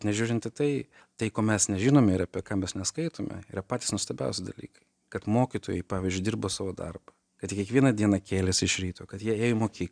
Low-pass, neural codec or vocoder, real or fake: 9.9 kHz; none; real